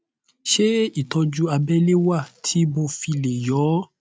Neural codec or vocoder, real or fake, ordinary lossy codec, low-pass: none; real; none; none